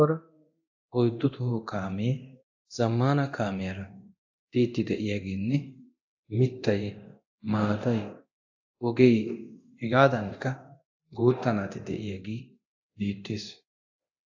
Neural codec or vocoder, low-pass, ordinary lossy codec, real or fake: codec, 24 kHz, 0.9 kbps, DualCodec; 7.2 kHz; AAC, 48 kbps; fake